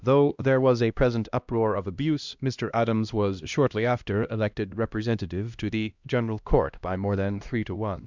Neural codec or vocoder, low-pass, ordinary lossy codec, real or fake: codec, 16 kHz, 1 kbps, X-Codec, HuBERT features, trained on LibriSpeech; 7.2 kHz; Opus, 64 kbps; fake